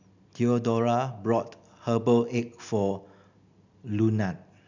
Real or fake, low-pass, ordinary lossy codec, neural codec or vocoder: real; 7.2 kHz; none; none